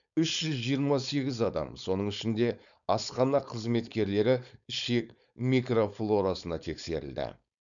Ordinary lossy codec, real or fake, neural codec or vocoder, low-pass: none; fake; codec, 16 kHz, 4.8 kbps, FACodec; 7.2 kHz